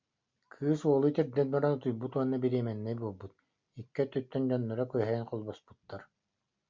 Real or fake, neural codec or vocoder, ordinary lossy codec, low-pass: real; none; MP3, 64 kbps; 7.2 kHz